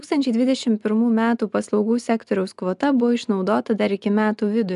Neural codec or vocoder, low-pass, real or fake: none; 10.8 kHz; real